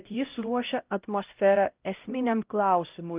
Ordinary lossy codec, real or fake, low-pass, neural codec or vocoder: Opus, 24 kbps; fake; 3.6 kHz; codec, 16 kHz, 0.5 kbps, X-Codec, HuBERT features, trained on LibriSpeech